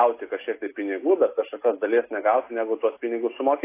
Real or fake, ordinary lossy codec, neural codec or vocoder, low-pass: real; AAC, 24 kbps; none; 3.6 kHz